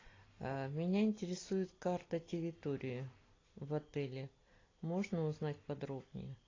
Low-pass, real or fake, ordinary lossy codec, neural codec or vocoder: 7.2 kHz; real; AAC, 32 kbps; none